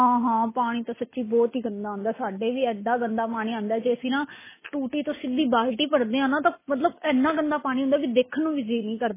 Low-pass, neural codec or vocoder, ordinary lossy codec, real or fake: 3.6 kHz; none; MP3, 16 kbps; real